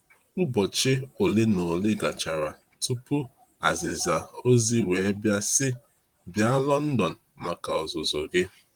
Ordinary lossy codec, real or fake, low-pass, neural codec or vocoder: Opus, 32 kbps; fake; 19.8 kHz; vocoder, 44.1 kHz, 128 mel bands, Pupu-Vocoder